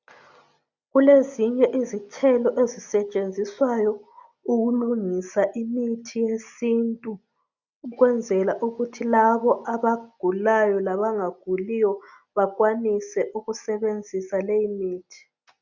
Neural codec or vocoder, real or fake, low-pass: none; real; 7.2 kHz